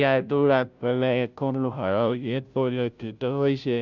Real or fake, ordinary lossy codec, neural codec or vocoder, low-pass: fake; none; codec, 16 kHz, 0.5 kbps, FunCodec, trained on Chinese and English, 25 frames a second; 7.2 kHz